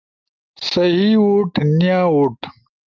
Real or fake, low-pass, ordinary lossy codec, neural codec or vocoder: real; 7.2 kHz; Opus, 24 kbps; none